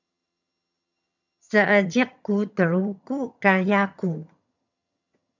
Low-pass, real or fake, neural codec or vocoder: 7.2 kHz; fake; vocoder, 22.05 kHz, 80 mel bands, HiFi-GAN